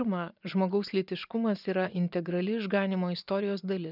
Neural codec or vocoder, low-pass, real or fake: vocoder, 24 kHz, 100 mel bands, Vocos; 5.4 kHz; fake